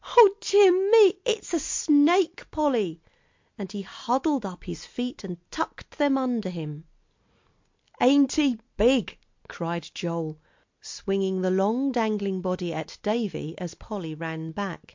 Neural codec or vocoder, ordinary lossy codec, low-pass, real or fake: none; MP3, 48 kbps; 7.2 kHz; real